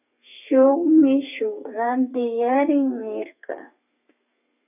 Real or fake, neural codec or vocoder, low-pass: fake; codec, 32 kHz, 1.9 kbps, SNAC; 3.6 kHz